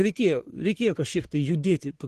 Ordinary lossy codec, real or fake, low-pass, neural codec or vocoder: Opus, 16 kbps; fake; 14.4 kHz; codec, 44.1 kHz, 3.4 kbps, Pupu-Codec